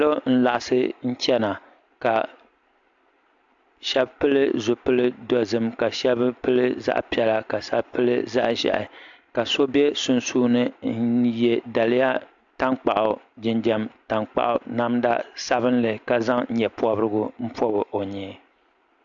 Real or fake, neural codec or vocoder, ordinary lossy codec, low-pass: real; none; AAC, 64 kbps; 7.2 kHz